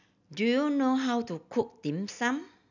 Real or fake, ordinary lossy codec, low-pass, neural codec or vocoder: real; none; 7.2 kHz; none